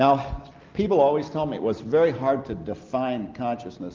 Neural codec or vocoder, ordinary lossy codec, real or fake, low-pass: none; Opus, 32 kbps; real; 7.2 kHz